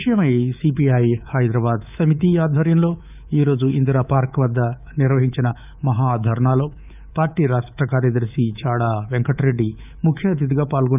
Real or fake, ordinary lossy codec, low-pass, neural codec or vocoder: fake; none; 3.6 kHz; codec, 24 kHz, 3.1 kbps, DualCodec